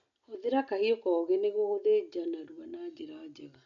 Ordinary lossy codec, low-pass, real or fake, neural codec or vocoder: none; 7.2 kHz; real; none